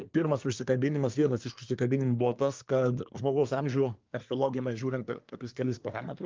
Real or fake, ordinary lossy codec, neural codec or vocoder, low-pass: fake; Opus, 32 kbps; codec, 24 kHz, 1 kbps, SNAC; 7.2 kHz